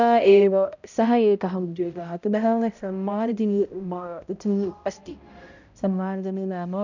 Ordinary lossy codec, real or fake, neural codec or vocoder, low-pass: none; fake; codec, 16 kHz, 0.5 kbps, X-Codec, HuBERT features, trained on balanced general audio; 7.2 kHz